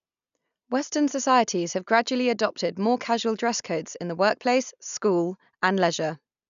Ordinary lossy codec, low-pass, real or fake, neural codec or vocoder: none; 7.2 kHz; real; none